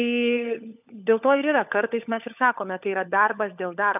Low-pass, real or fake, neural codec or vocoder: 3.6 kHz; fake; codec, 16 kHz, 16 kbps, FunCodec, trained on LibriTTS, 50 frames a second